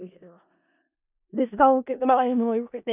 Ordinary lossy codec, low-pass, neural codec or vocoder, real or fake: none; 3.6 kHz; codec, 16 kHz in and 24 kHz out, 0.4 kbps, LongCat-Audio-Codec, four codebook decoder; fake